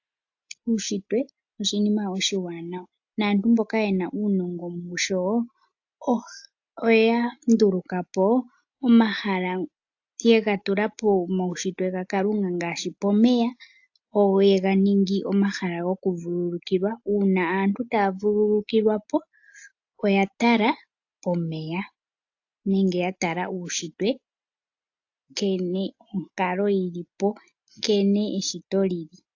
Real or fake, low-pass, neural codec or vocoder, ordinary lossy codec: real; 7.2 kHz; none; AAC, 48 kbps